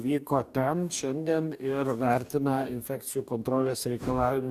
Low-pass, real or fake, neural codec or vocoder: 14.4 kHz; fake; codec, 44.1 kHz, 2.6 kbps, DAC